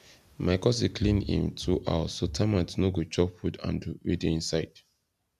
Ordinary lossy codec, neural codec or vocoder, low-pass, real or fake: none; none; 14.4 kHz; real